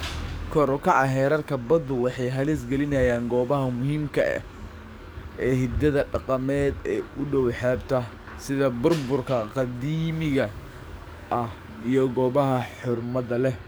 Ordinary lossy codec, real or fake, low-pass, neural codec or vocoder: none; fake; none; codec, 44.1 kHz, 7.8 kbps, DAC